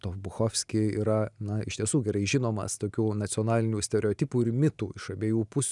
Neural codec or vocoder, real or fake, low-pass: none; real; 10.8 kHz